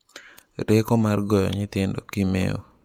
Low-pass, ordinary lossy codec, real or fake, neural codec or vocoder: 19.8 kHz; MP3, 96 kbps; fake; vocoder, 48 kHz, 128 mel bands, Vocos